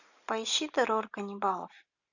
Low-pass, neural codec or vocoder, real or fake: 7.2 kHz; none; real